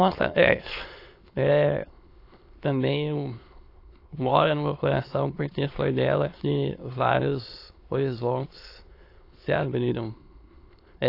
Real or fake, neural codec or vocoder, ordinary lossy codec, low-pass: fake; autoencoder, 22.05 kHz, a latent of 192 numbers a frame, VITS, trained on many speakers; AAC, 32 kbps; 5.4 kHz